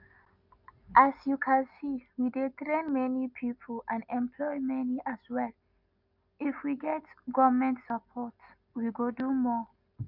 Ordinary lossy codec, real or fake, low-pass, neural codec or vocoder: none; real; 5.4 kHz; none